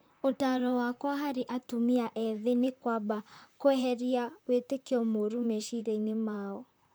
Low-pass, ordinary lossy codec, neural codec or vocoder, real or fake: none; none; vocoder, 44.1 kHz, 128 mel bands, Pupu-Vocoder; fake